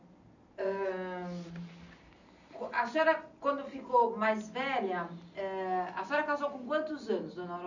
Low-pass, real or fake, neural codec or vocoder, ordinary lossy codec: 7.2 kHz; real; none; none